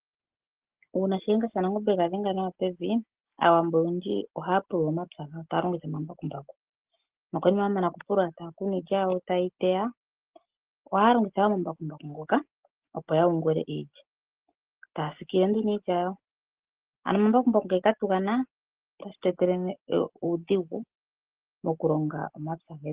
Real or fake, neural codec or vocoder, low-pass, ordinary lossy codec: real; none; 3.6 kHz; Opus, 16 kbps